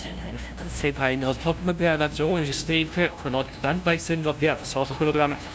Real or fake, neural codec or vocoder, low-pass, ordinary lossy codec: fake; codec, 16 kHz, 0.5 kbps, FunCodec, trained on LibriTTS, 25 frames a second; none; none